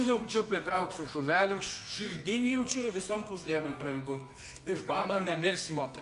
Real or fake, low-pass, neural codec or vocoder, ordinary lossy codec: fake; 10.8 kHz; codec, 24 kHz, 0.9 kbps, WavTokenizer, medium music audio release; MP3, 64 kbps